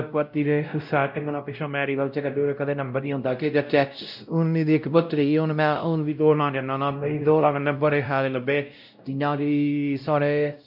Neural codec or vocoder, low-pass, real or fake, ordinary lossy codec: codec, 16 kHz, 0.5 kbps, X-Codec, WavLM features, trained on Multilingual LibriSpeech; 5.4 kHz; fake; none